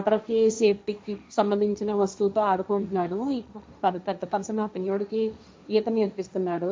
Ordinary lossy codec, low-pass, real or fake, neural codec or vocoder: none; none; fake; codec, 16 kHz, 1.1 kbps, Voila-Tokenizer